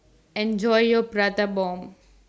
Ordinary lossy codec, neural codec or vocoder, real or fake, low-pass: none; none; real; none